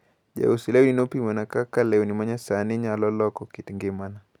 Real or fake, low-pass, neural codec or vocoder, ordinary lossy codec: real; 19.8 kHz; none; none